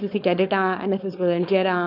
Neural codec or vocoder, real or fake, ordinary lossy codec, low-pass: codec, 16 kHz, 4.8 kbps, FACodec; fake; none; 5.4 kHz